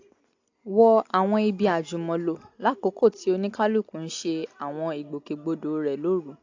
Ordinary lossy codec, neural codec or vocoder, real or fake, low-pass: AAC, 48 kbps; none; real; 7.2 kHz